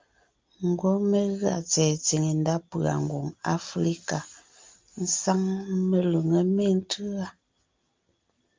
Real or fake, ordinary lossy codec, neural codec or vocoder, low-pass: real; Opus, 24 kbps; none; 7.2 kHz